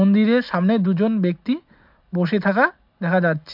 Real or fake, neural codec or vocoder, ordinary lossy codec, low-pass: real; none; MP3, 48 kbps; 5.4 kHz